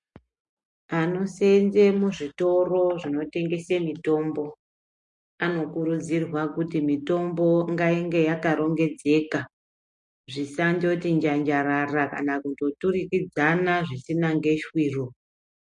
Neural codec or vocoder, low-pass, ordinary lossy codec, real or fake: none; 10.8 kHz; MP3, 48 kbps; real